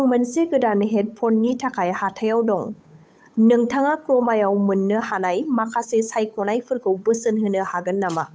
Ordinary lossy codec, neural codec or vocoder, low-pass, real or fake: none; codec, 16 kHz, 8 kbps, FunCodec, trained on Chinese and English, 25 frames a second; none; fake